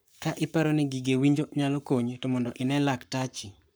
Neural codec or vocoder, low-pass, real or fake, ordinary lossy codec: codec, 44.1 kHz, 7.8 kbps, Pupu-Codec; none; fake; none